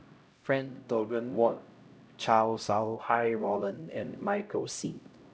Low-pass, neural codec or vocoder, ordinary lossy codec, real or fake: none; codec, 16 kHz, 0.5 kbps, X-Codec, HuBERT features, trained on LibriSpeech; none; fake